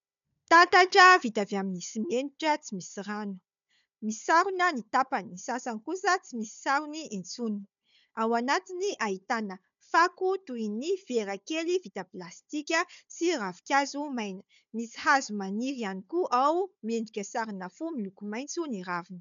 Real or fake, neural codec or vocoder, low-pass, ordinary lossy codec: fake; codec, 16 kHz, 4 kbps, FunCodec, trained on Chinese and English, 50 frames a second; 7.2 kHz; MP3, 96 kbps